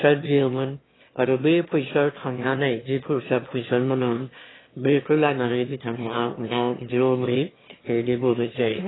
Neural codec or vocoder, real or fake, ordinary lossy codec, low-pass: autoencoder, 22.05 kHz, a latent of 192 numbers a frame, VITS, trained on one speaker; fake; AAC, 16 kbps; 7.2 kHz